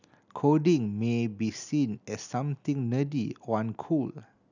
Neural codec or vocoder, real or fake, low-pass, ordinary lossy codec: none; real; 7.2 kHz; none